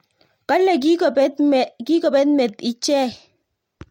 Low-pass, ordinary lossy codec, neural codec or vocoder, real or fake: 19.8 kHz; MP3, 64 kbps; none; real